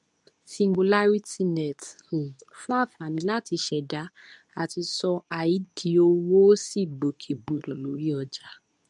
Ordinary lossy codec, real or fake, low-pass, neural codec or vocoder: none; fake; 10.8 kHz; codec, 24 kHz, 0.9 kbps, WavTokenizer, medium speech release version 2